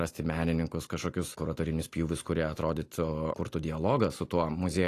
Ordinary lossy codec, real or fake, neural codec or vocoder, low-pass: AAC, 48 kbps; real; none; 14.4 kHz